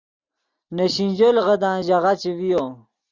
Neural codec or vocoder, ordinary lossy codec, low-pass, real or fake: none; Opus, 64 kbps; 7.2 kHz; real